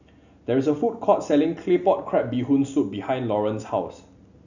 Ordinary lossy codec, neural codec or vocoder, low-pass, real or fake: none; none; 7.2 kHz; real